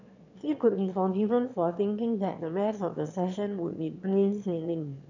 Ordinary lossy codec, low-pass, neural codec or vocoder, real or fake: none; 7.2 kHz; autoencoder, 22.05 kHz, a latent of 192 numbers a frame, VITS, trained on one speaker; fake